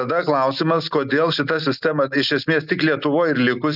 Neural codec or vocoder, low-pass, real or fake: none; 5.4 kHz; real